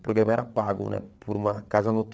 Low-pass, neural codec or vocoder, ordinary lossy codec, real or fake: none; codec, 16 kHz, 8 kbps, FreqCodec, larger model; none; fake